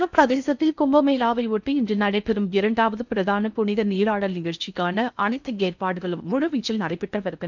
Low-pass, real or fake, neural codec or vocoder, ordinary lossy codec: 7.2 kHz; fake; codec, 16 kHz in and 24 kHz out, 0.6 kbps, FocalCodec, streaming, 4096 codes; none